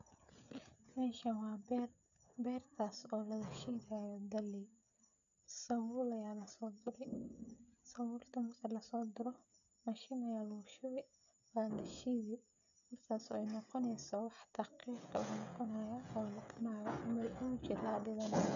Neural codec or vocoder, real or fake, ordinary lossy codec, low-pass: codec, 16 kHz, 16 kbps, FreqCodec, smaller model; fake; none; 7.2 kHz